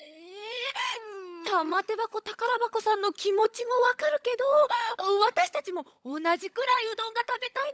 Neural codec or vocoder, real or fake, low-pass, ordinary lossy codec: codec, 16 kHz, 16 kbps, FunCodec, trained on LibriTTS, 50 frames a second; fake; none; none